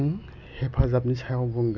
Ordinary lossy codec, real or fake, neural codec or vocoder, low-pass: MP3, 64 kbps; real; none; 7.2 kHz